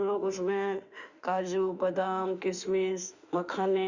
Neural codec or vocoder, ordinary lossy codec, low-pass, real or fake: autoencoder, 48 kHz, 32 numbers a frame, DAC-VAE, trained on Japanese speech; Opus, 64 kbps; 7.2 kHz; fake